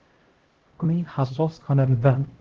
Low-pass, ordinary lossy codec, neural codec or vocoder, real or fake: 7.2 kHz; Opus, 16 kbps; codec, 16 kHz, 0.5 kbps, X-Codec, HuBERT features, trained on LibriSpeech; fake